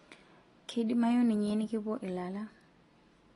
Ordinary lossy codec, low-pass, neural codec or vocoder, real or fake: AAC, 32 kbps; 10.8 kHz; none; real